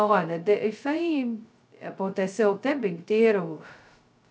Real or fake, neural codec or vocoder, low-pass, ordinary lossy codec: fake; codec, 16 kHz, 0.2 kbps, FocalCodec; none; none